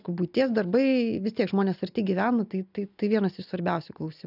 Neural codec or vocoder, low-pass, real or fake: none; 5.4 kHz; real